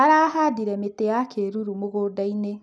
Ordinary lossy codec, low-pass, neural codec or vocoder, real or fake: none; none; none; real